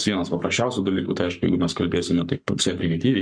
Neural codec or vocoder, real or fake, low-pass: codec, 44.1 kHz, 7.8 kbps, Pupu-Codec; fake; 9.9 kHz